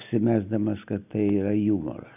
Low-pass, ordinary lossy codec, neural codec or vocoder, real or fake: 3.6 kHz; AAC, 32 kbps; none; real